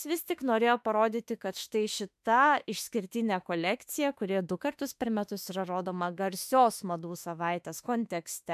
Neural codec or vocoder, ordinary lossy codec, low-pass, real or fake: autoencoder, 48 kHz, 32 numbers a frame, DAC-VAE, trained on Japanese speech; MP3, 96 kbps; 14.4 kHz; fake